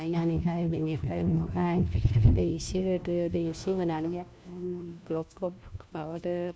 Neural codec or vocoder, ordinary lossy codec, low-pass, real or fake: codec, 16 kHz, 1 kbps, FunCodec, trained on LibriTTS, 50 frames a second; none; none; fake